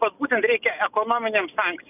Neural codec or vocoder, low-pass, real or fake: none; 3.6 kHz; real